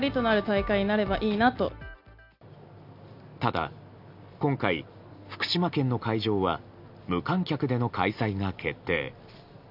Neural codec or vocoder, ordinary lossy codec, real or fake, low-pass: none; none; real; 5.4 kHz